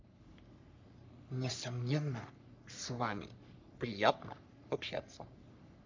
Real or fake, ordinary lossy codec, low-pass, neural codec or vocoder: fake; MP3, 64 kbps; 7.2 kHz; codec, 44.1 kHz, 3.4 kbps, Pupu-Codec